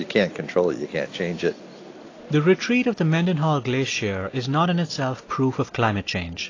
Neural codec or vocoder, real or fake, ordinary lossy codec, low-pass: none; real; AAC, 32 kbps; 7.2 kHz